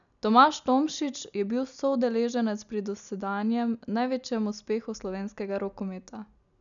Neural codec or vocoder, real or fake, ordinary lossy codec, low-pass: none; real; none; 7.2 kHz